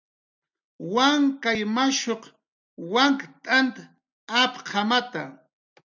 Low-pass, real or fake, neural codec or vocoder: 7.2 kHz; real; none